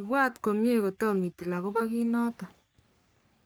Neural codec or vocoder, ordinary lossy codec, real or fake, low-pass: codec, 44.1 kHz, 3.4 kbps, Pupu-Codec; none; fake; none